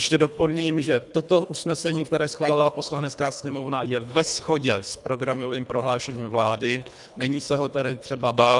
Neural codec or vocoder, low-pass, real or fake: codec, 24 kHz, 1.5 kbps, HILCodec; 10.8 kHz; fake